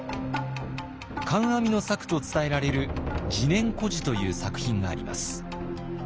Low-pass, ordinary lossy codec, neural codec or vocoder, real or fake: none; none; none; real